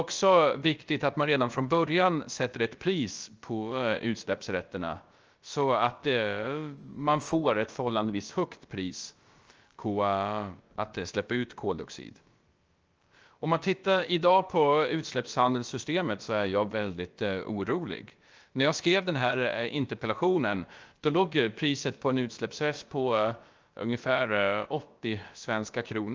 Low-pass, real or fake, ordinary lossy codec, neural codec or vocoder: 7.2 kHz; fake; Opus, 24 kbps; codec, 16 kHz, about 1 kbps, DyCAST, with the encoder's durations